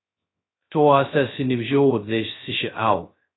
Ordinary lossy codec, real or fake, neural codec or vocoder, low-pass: AAC, 16 kbps; fake; codec, 16 kHz, 0.2 kbps, FocalCodec; 7.2 kHz